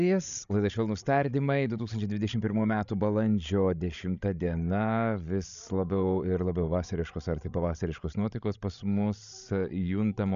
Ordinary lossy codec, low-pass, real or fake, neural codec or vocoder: MP3, 96 kbps; 7.2 kHz; fake; codec, 16 kHz, 16 kbps, FreqCodec, larger model